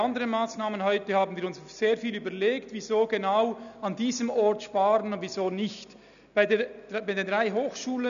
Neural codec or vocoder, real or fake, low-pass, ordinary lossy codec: none; real; 7.2 kHz; none